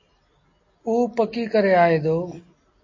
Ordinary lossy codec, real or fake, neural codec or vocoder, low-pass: MP3, 32 kbps; real; none; 7.2 kHz